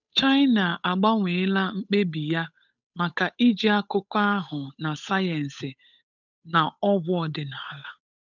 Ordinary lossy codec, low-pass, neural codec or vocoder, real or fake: none; 7.2 kHz; codec, 16 kHz, 8 kbps, FunCodec, trained on Chinese and English, 25 frames a second; fake